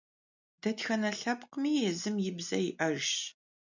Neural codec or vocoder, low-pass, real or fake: none; 7.2 kHz; real